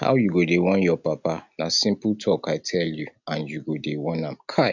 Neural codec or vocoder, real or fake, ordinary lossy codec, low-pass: none; real; none; 7.2 kHz